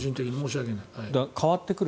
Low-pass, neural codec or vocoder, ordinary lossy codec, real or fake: none; none; none; real